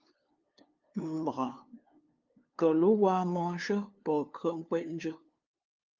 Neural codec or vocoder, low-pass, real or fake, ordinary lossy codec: codec, 16 kHz, 2 kbps, FunCodec, trained on LibriTTS, 25 frames a second; 7.2 kHz; fake; Opus, 24 kbps